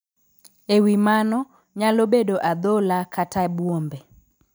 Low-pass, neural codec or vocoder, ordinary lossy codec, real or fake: none; none; none; real